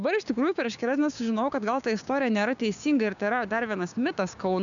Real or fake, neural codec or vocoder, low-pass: fake; codec, 16 kHz, 6 kbps, DAC; 7.2 kHz